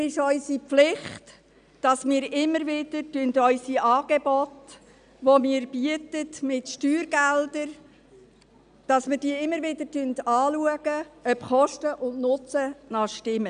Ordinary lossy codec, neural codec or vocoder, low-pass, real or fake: none; none; 9.9 kHz; real